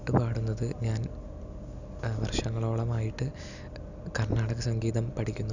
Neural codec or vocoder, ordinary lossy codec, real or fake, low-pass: none; none; real; 7.2 kHz